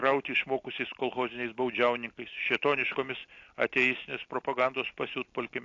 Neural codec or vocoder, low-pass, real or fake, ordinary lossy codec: none; 7.2 kHz; real; AAC, 48 kbps